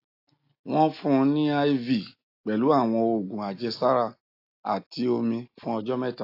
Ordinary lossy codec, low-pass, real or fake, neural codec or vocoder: AAC, 32 kbps; 5.4 kHz; real; none